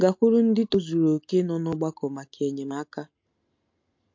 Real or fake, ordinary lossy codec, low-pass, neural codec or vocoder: real; MP3, 48 kbps; 7.2 kHz; none